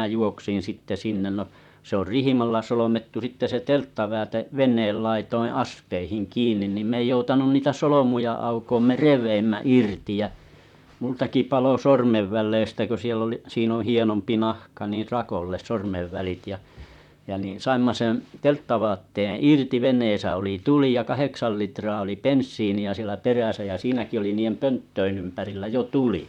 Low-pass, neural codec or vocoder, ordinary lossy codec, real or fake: 19.8 kHz; vocoder, 44.1 kHz, 128 mel bands, Pupu-Vocoder; none; fake